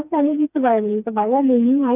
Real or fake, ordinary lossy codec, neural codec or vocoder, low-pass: fake; none; codec, 16 kHz, 2 kbps, FreqCodec, smaller model; 3.6 kHz